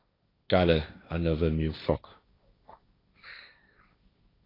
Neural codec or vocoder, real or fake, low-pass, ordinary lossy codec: codec, 16 kHz, 1.1 kbps, Voila-Tokenizer; fake; 5.4 kHz; AAC, 24 kbps